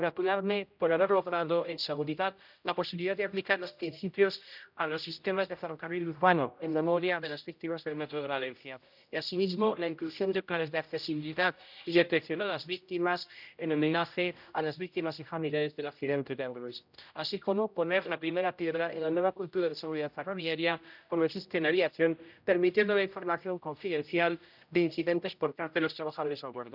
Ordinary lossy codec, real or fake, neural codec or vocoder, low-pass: none; fake; codec, 16 kHz, 0.5 kbps, X-Codec, HuBERT features, trained on general audio; 5.4 kHz